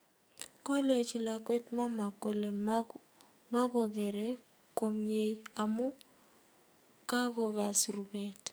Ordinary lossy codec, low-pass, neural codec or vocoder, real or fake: none; none; codec, 44.1 kHz, 2.6 kbps, SNAC; fake